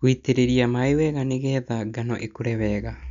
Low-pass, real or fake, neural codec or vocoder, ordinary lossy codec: 7.2 kHz; real; none; none